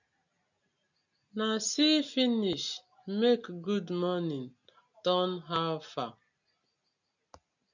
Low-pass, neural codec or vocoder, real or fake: 7.2 kHz; none; real